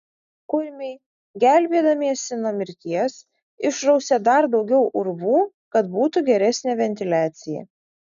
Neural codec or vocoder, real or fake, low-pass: none; real; 7.2 kHz